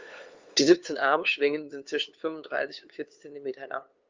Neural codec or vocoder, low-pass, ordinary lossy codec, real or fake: codec, 16 kHz, 2 kbps, FunCodec, trained on LibriTTS, 25 frames a second; 7.2 kHz; Opus, 32 kbps; fake